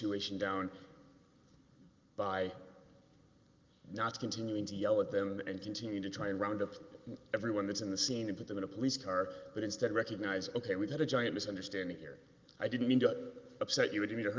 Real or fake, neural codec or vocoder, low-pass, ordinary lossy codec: real; none; 7.2 kHz; Opus, 32 kbps